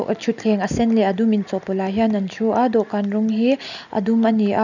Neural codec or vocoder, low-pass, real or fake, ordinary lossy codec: none; 7.2 kHz; real; none